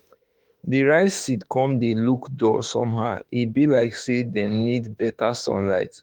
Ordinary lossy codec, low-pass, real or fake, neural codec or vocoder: Opus, 24 kbps; 19.8 kHz; fake; autoencoder, 48 kHz, 32 numbers a frame, DAC-VAE, trained on Japanese speech